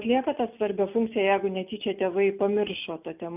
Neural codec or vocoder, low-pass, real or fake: none; 3.6 kHz; real